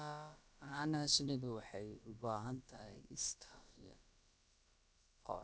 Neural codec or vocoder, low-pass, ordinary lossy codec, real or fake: codec, 16 kHz, about 1 kbps, DyCAST, with the encoder's durations; none; none; fake